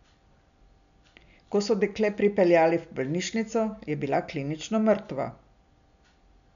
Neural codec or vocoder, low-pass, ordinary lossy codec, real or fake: none; 7.2 kHz; none; real